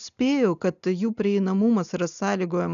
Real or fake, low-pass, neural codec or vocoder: real; 7.2 kHz; none